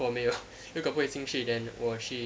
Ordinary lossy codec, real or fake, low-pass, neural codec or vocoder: none; real; none; none